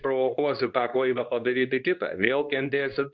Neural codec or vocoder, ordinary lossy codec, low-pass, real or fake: codec, 24 kHz, 0.9 kbps, WavTokenizer, medium speech release version 2; Opus, 64 kbps; 7.2 kHz; fake